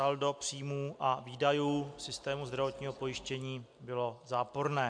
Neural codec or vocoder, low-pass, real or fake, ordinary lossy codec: none; 9.9 kHz; real; MP3, 64 kbps